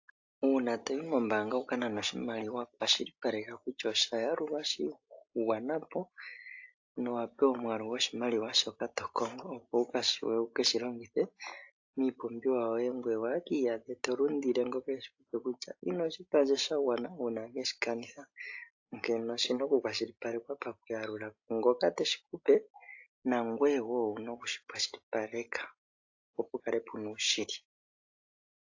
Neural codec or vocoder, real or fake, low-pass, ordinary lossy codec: none; real; 7.2 kHz; AAC, 48 kbps